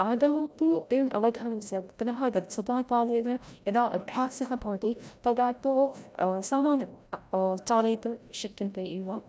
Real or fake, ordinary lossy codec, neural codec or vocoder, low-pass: fake; none; codec, 16 kHz, 0.5 kbps, FreqCodec, larger model; none